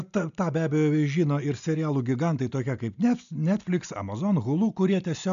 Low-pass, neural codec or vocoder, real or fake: 7.2 kHz; none; real